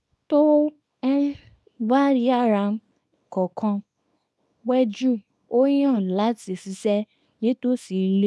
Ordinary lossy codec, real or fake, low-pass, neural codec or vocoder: none; fake; none; codec, 24 kHz, 0.9 kbps, WavTokenizer, small release